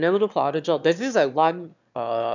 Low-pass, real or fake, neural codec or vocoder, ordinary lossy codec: 7.2 kHz; fake; autoencoder, 22.05 kHz, a latent of 192 numbers a frame, VITS, trained on one speaker; none